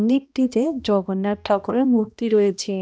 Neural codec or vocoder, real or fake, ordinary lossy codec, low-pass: codec, 16 kHz, 1 kbps, X-Codec, HuBERT features, trained on balanced general audio; fake; none; none